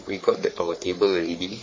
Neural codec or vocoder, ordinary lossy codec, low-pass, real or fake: codec, 44.1 kHz, 3.4 kbps, Pupu-Codec; MP3, 32 kbps; 7.2 kHz; fake